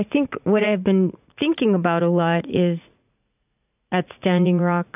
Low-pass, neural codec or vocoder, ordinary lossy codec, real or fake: 3.6 kHz; vocoder, 44.1 kHz, 80 mel bands, Vocos; AAC, 32 kbps; fake